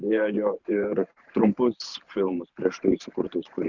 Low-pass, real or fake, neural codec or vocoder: 7.2 kHz; fake; vocoder, 44.1 kHz, 128 mel bands every 512 samples, BigVGAN v2